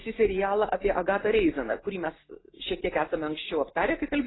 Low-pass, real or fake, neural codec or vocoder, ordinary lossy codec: 7.2 kHz; real; none; AAC, 16 kbps